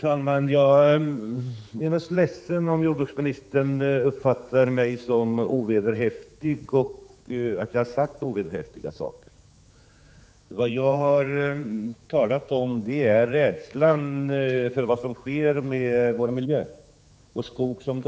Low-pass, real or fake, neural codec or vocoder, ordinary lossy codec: none; fake; codec, 16 kHz, 4 kbps, X-Codec, HuBERT features, trained on general audio; none